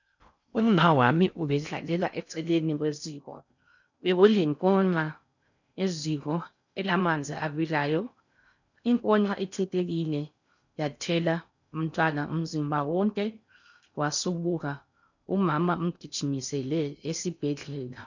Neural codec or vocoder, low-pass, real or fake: codec, 16 kHz in and 24 kHz out, 0.6 kbps, FocalCodec, streaming, 4096 codes; 7.2 kHz; fake